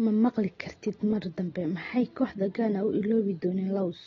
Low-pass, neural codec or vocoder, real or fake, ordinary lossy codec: 7.2 kHz; none; real; AAC, 24 kbps